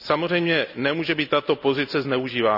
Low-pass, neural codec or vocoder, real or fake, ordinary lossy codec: 5.4 kHz; none; real; none